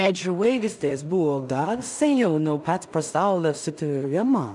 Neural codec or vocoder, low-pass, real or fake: codec, 16 kHz in and 24 kHz out, 0.4 kbps, LongCat-Audio-Codec, two codebook decoder; 10.8 kHz; fake